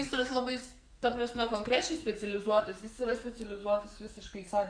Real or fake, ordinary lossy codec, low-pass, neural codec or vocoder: fake; Opus, 64 kbps; 9.9 kHz; codec, 44.1 kHz, 2.6 kbps, SNAC